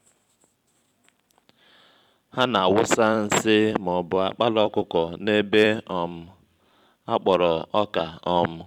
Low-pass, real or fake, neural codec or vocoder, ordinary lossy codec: 19.8 kHz; fake; vocoder, 44.1 kHz, 128 mel bands every 256 samples, BigVGAN v2; none